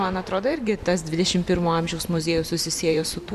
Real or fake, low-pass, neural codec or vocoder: fake; 14.4 kHz; vocoder, 44.1 kHz, 128 mel bands every 512 samples, BigVGAN v2